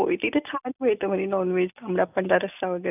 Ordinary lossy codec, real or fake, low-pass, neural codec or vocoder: none; real; 3.6 kHz; none